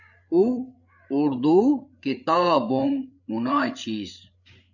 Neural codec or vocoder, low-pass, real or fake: codec, 16 kHz, 8 kbps, FreqCodec, larger model; 7.2 kHz; fake